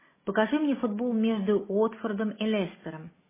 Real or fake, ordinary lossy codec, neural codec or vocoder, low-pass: real; MP3, 16 kbps; none; 3.6 kHz